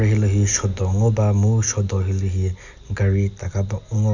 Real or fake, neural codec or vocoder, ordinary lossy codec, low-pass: real; none; none; 7.2 kHz